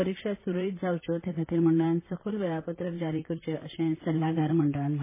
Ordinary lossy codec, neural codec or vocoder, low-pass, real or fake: MP3, 16 kbps; vocoder, 44.1 kHz, 128 mel bands, Pupu-Vocoder; 3.6 kHz; fake